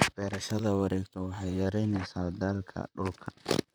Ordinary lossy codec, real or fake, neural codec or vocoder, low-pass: none; fake; vocoder, 44.1 kHz, 128 mel bands, Pupu-Vocoder; none